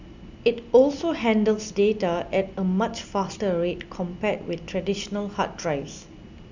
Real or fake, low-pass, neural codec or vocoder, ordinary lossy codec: real; 7.2 kHz; none; Opus, 64 kbps